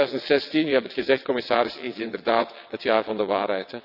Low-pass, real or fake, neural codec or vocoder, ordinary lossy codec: 5.4 kHz; fake; vocoder, 22.05 kHz, 80 mel bands, WaveNeXt; none